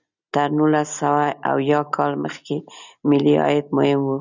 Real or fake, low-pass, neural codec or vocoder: real; 7.2 kHz; none